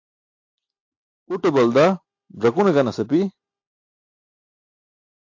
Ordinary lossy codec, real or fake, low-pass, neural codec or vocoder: AAC, 48 kbps; real; 7.2 kHz; none